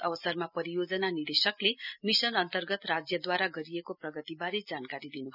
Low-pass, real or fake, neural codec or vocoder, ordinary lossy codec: 5.4 kHz; real; none; none